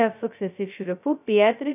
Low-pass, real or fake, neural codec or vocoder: 3.6 kHz; fake; codec, 16 kHz, 0.2 kbps, FocalCodec